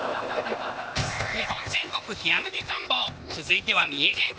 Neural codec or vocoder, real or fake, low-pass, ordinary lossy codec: codec, 16 kHz, 0.8 kbps, ZipCodec; fake; none; none